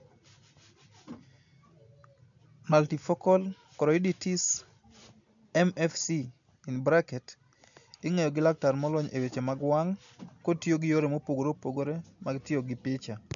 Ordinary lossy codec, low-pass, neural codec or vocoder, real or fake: none; 7.2 kHz; none; real